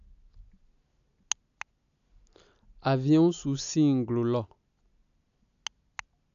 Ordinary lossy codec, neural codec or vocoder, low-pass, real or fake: none; none; 7.2 kHz; real